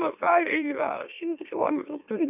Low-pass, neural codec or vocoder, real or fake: 3.6 kHz; autoencoder, 44.1 kHz, a latent of 192 numbers a frame, MeloTTS; fake